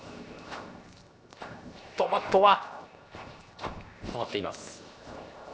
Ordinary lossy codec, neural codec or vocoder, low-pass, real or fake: none; codec, 16 kHz, 0.7 kbps, FocalCodec; none; fake